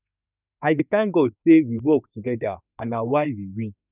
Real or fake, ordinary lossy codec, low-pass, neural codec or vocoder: fake; none; 3.6 kHz; autoencoder, 48 kHz, 32 numbers a frame, DAC-VAE, trained on Japanese speech